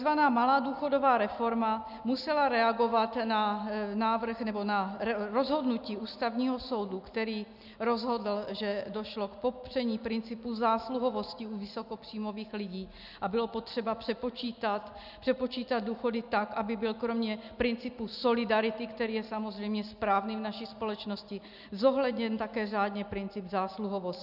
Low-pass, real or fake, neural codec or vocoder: 5.4 kHz; real; none